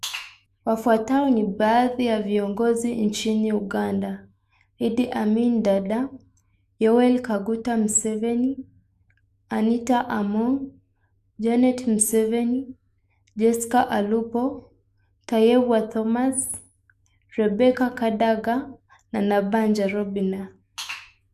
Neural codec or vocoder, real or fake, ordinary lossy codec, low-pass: autoencoder, 48 kHz, 128 numbers a frame, DAC-VAE, trained on Japanese speech; fake; Opus, 64 kbps; 14.4 kHz